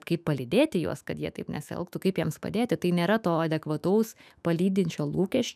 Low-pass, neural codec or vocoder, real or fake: 14.4 kHz; autoencoder, 48 kHz, 128 numbers a frame, DAC-VAE, trained on Japanese speech; fake